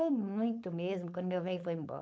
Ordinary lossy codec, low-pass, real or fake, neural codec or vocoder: none; none; fake; codec, 16 kHz, 4.8 kbps, FACodec